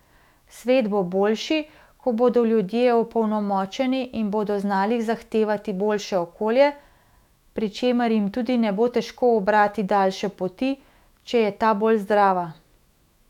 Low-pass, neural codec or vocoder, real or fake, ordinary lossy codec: 19.8 kHz; autoencoder, 48 kHz, 128 numbers a frame, DAC-VAE, trained on Japanese speech; fake; none